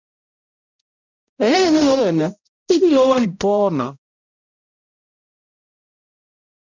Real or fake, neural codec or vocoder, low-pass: fake; codec, 16 kHz, 0.5 kbps, X-Codec, HuBERT features, trained on balanced general audio; 7.2 kHz